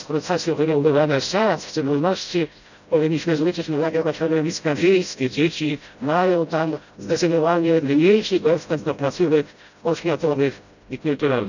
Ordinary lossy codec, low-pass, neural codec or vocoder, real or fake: none; 7.2 kHz; codec, 16 kHz, 0.5 kbps, FreqCodec, smaller model; fake